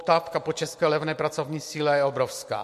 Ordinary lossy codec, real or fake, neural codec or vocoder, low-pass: MP3, 64 kbps; real; none; 14.4 kHz